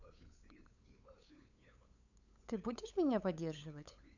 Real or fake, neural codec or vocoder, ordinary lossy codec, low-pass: fake; codec, 16 kHz, 8 kbps, FunCodec, trained on LibriTTS, 25 frames a second; none; 7.2 kHz